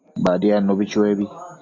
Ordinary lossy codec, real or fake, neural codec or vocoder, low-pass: AAC, 48 kbps; real; none; 7.2 kHz